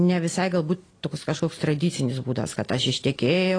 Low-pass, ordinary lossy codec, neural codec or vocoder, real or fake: 9.9 kHz; AAC, 32 kbps; none; real